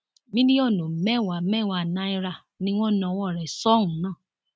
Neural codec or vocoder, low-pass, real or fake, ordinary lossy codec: none; none; real; none